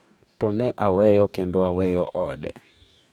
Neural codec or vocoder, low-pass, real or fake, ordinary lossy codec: codec, 44.1 kHz, 2.6 kbps, DAC; 19.8 kHz; fake; none